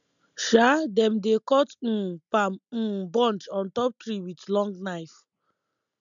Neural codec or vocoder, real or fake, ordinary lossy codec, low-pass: none; real; none; 7.2 kHz